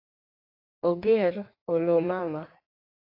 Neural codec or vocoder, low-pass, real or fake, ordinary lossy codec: codec, 16 kHz in and 24 kHz out, 1.1 kbps, FireRedTTS-2 codec; 5.4 kHz; fake; AAC, 32 kbps